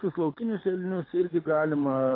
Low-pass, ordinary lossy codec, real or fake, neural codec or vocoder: 5.4 kHz; AAC, 24 kbps; fake; codec, 24 kHz, 6 kbps, HILCodec